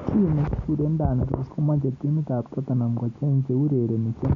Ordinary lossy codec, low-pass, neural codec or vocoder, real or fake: none; 7.2 kHz; none; real